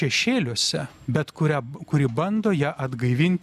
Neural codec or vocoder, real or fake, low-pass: none; real; 14.4 kHz